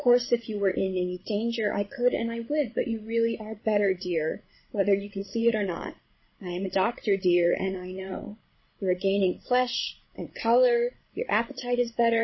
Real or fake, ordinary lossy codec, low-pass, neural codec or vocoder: fake; MP3, 24 kbps; 7.2 kHz; codec, 16 kHz, 16 kbps, FreqCodec, larger model